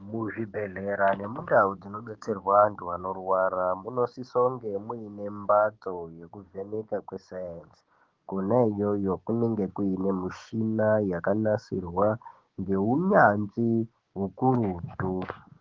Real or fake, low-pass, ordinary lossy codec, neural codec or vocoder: fake; 7.2 kHz; Opus, 16 kbps; codec, 16 kHz, 6 kbps, DAC